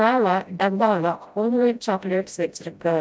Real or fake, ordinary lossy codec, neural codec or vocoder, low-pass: fake; none; codec, 16 kHz, 0.5 kbps, FreqCodec, smaller model; none